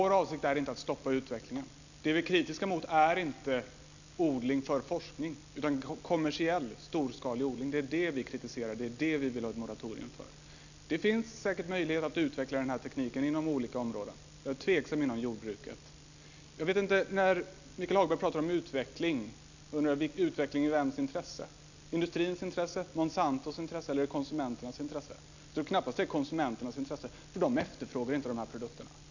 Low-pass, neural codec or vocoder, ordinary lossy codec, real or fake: 7.2 kHz; none; none; real